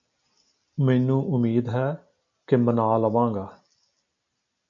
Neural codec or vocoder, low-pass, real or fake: none; 7.2 kHz; real